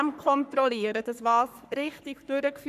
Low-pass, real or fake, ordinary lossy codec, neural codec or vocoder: 14.4 kHz; fake; none; codec, 44.1 kHz, 3.4 kbps, Pupu-Codec